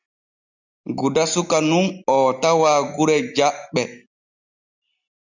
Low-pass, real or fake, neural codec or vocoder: 7.2 kHz; real; none